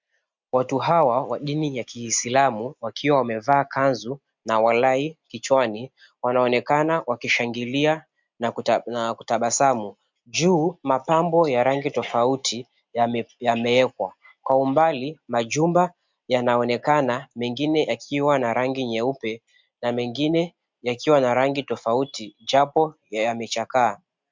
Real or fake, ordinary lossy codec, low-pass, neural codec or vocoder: real; MP3, 64 kbps; 7.2 kHz; none